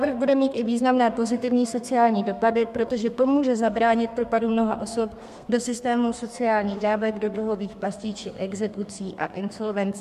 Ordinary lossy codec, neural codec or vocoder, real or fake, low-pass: MP3, 96 kbps; codec, 32 kHz, 1.9 kbps, SNAC; fake; 14.4 kHz